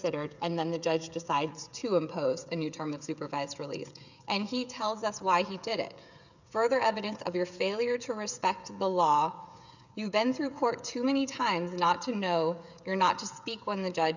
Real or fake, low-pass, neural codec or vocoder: fake; 7.2 kHz; codec, 16 kHz, 16 kbps, FreqCodec, smaller model